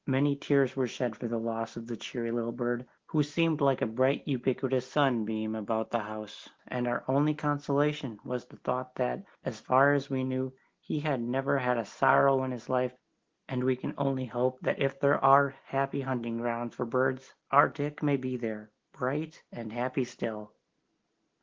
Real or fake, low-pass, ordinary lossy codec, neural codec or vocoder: real; 7.2 kHz; Opus, 16 kbps; none